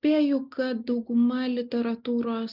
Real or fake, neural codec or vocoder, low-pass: real; none; 5.4 kHz